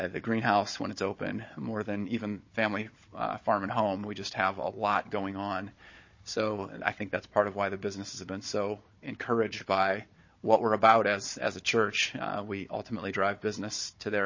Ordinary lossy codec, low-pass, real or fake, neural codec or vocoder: MP3, 32 kbps; 7.2 kHz; fake; codec, 16 kHz, 16 kbps, FunCodec, trained on Chinese and English, 50 frames a second